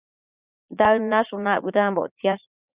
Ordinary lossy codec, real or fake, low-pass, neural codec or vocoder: Opus, 64 kbps; fake; 3.6 kHz; vocoder, 44.1 kHz, 80 mel bands, Vocos